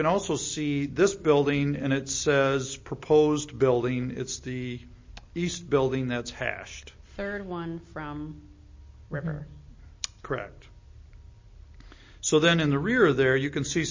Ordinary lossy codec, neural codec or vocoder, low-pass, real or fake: MP3, 32 kbps; none; 7.2 kHz; real